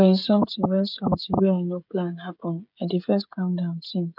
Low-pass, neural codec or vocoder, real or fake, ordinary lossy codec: 5.4 kHz; codec, 16 kHz, 16 kbps, FreqCodec, smaller model; fake; none